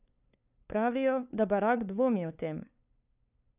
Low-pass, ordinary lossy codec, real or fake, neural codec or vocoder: 3.6 kHz; none; fake; codec, 16 kHz, 2 kbps, FunCodec, trained on LibriTTS, 25 frames a second